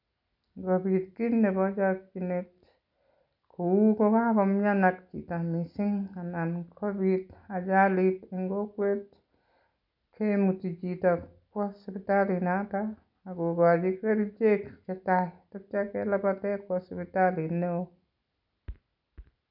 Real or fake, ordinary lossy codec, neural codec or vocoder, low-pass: real; none; none; 5.4 kHz